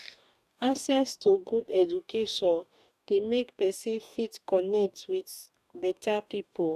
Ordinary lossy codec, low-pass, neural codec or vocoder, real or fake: none; 14.4 kHz; codec, 44.1 kHz, 2.6 kbps, DAC; fake